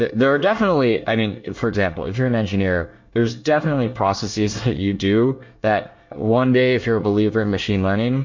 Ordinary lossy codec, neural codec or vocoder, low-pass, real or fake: MP3, 48 kbps; codec, 24 kHz, 1 kbps, SNAC; 7.2 kHz; fake